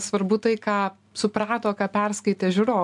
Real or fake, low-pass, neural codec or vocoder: real; 10.8 kHz; none